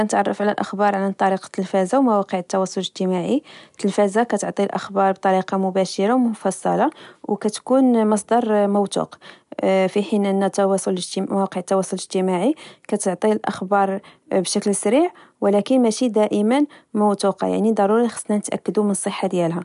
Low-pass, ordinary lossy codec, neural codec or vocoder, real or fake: 10.8 kHz; none; none; real